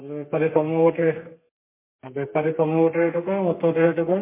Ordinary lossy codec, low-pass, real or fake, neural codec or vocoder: MP3, 24 kbps; 3.6 kHz; fake; codec, 32 kHz, 1.9 kbps, SNAC